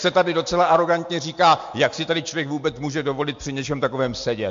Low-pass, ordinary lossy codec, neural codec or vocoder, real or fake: 7.2 kHz; MP3, 48 kbps; none; real